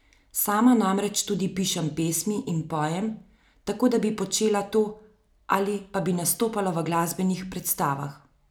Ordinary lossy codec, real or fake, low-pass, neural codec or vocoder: none; real; none; none